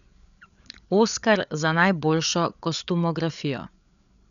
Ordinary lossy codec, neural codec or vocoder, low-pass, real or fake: none; codec, 16 kHz, 8 kbps, FreqCodec, larger model; 7.2 kHz; fake